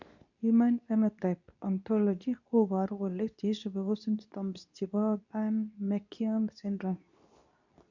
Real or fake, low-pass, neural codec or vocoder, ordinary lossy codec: fake; 7.2 kHz; codec, 24 kHz, 0.9 kbps, WavTokenizer, medium speech release version 2; none